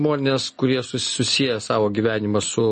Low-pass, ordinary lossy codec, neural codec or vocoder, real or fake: 10.8 kHz; MP3, 32 kbps; none; real